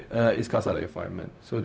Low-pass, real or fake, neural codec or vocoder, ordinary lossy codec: none; fake; codec, 16 kHz, 0.4 kbps, LongCat-Audio-Codec; none